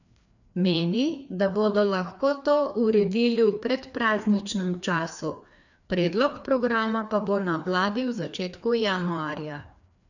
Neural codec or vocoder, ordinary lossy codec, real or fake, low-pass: codec, 16 kHz, 2 kbps, FreqCodec, larger model; none; fake; 7.2 kHz